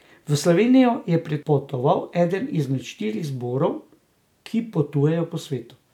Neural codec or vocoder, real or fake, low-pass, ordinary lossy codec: none; real; 19.8 kHz; none